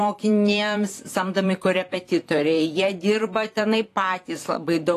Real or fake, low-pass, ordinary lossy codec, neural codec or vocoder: fake; 14.4 kHz; AAC, 48 kbps; vocoder, 44.1 kHz, 128 mel bands, Pupu-Vocoder